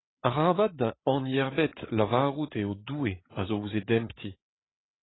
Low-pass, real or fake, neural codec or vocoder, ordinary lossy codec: 7.2 kHz; fake; codec, 16 kHz, 8 kbps, FreqCodec, larger model; AAC, 16 kbps